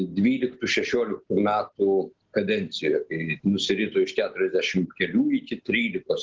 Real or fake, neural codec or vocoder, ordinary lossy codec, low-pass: real; none; Opus, 16 kbps; 7.2 kHz